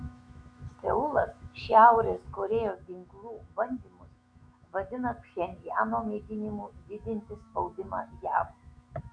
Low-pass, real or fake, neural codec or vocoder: 9.9 kHz; fake; autoencoder, 48 kHz, 128 numbers a frame, DAC-VAE, trained on Japanese speech